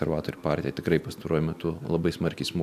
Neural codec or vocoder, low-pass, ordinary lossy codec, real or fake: none; 14.4 kHz; AAC, 96 kbps; real